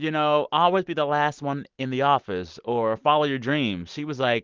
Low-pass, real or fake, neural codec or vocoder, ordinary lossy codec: 7.2 kHz; real; none; Opus, 32 kbps